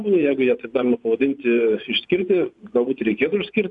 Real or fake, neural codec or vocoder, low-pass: fake; vocoder, 24 kHz, 100 mel bands, Vocos; 10.8 kHz